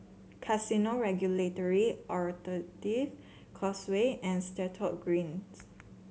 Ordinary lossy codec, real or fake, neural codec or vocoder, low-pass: none; real; none; none